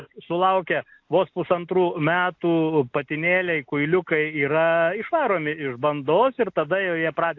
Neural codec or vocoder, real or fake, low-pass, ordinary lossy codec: none; real; 7.2 kHz; AAC, 48 kbps